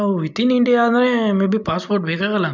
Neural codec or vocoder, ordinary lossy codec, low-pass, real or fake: none; none; 7.2 kHz; real